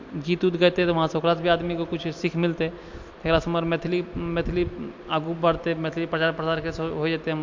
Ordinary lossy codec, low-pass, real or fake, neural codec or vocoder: MP3, 48 kbps; 7.2 kHz; real; none